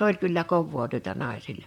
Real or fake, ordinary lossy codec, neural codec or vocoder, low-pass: fake; MP3, 96 kbps; vocoder, 44.1 kHz, 128 mel bands, Pupu-Vocoder; 19.8 kHz